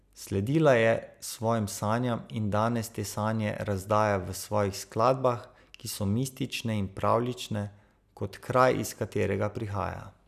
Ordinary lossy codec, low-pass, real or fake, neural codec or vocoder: none; 14.4 kHz; real; none